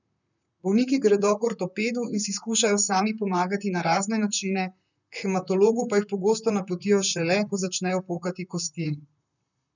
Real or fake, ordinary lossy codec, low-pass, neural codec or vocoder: fake; none; 7.2 kHz; vocoder, 44.1 kHz, 128 mel bands, Pupu-Vocoder